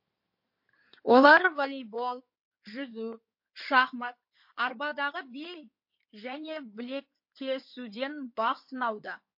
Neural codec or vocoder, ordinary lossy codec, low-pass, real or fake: codec, 16 kHz in and 24 kHz out, 2.2 kbps, FireRedTTS-2 codec; MP3, 32 kbps; 5.4 kHz; fake